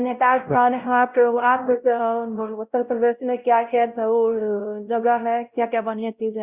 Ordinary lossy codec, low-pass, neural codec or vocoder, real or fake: Opus, 64 kbps; 3.6 kHz; codec, 16 kHz, 0.5 kbps, X-Codec, WavLM features, trained on Multilingual LibriSpeech; fake